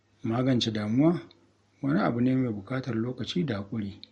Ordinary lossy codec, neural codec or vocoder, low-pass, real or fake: MP3, 48 kbps; none; 19.8 kHz; real